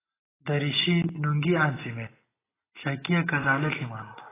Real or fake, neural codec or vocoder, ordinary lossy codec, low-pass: real; none; AAC, 16 kbps; 3.6 kHz